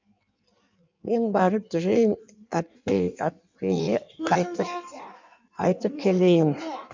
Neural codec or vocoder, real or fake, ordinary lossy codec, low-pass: codec, 16 kHz in and 24 kHz out, 1.1 kbps, FireRedTTS-2 codec; fake; MP3, 64 kbps; 7.2 kHz